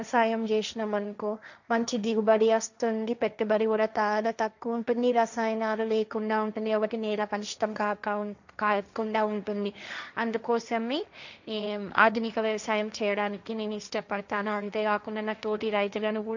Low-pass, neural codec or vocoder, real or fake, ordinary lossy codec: 7.2 kHz; codec, 16 kHz, 1.1 kbps, Voila-Tokenizer; fake; none